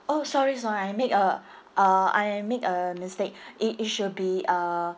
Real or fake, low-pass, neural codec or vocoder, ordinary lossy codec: real; none; none; none